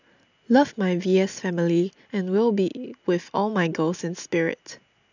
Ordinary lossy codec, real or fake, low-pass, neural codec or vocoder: none; real; 7.2 kHz; none